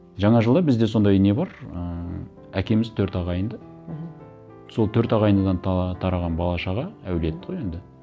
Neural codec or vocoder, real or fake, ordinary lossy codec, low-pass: none; real; none; none